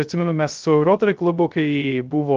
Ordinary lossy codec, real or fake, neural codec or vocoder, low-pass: Opus, 32 kbps; fake; codec, 16 kHz, 0.3 kbps, FocalCodec; 7.2 kHz